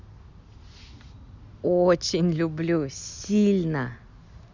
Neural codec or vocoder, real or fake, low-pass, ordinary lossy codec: none; real; 7.2 kHz; Opus, 64 kbps